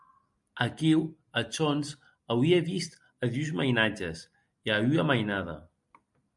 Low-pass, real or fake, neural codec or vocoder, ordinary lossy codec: 10.8 kHz; real; none; MP3, 96 kbps